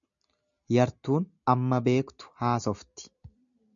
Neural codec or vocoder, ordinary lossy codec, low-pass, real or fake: none; MP3, 96 kbps; 7.2 kHz; real